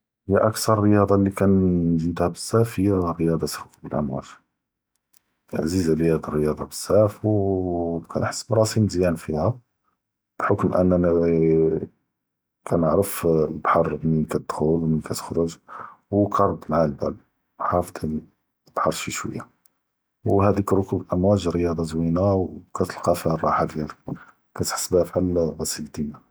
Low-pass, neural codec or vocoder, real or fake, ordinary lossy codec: none; none; real; none